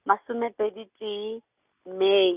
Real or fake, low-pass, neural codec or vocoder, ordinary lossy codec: real; 3.6 kHz; none; Opus, 64 kbps